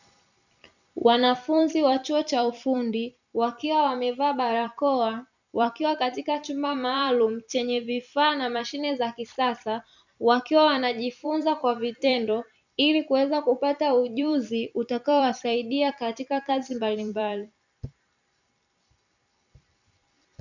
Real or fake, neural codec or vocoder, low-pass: fake; vocoder, 44.1 kHz, 128 mel bands every 256 samples, BigVGAN v2; 7.2 kHz